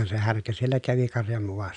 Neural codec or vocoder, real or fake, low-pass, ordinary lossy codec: none; real; 9.9 kHz; none